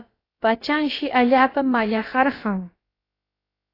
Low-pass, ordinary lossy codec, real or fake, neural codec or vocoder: 5.4 kHz; AAC, 24 kbps; fake; codec, 16 kHz, about 1 kbps, DyCAST, with the encoder's durations